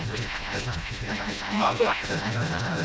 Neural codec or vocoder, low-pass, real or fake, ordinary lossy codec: codec, 16 kHz, 0.5 kbps, FreqCodec, smaller model; none; fake; none